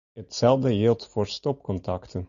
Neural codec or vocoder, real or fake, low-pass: none; real; 7.2 kHz